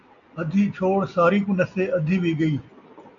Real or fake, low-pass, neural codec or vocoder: real; 7.2 kHz; none